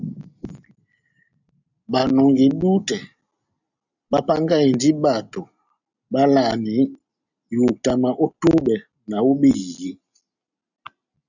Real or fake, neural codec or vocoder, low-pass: real; none; 7.2 kHz